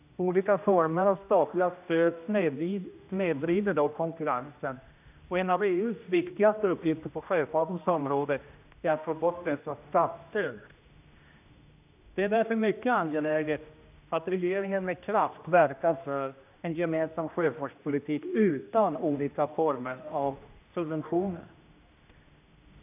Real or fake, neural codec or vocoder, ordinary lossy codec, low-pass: fake; codec, 16 kHz, 1 kbps, X-Codec, HuBERT features, trained on general audio; AAC, 32 kbps; 3.6 kHz